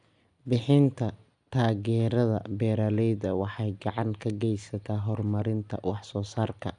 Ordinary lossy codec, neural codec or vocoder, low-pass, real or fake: none; none; 9.9 kHz; real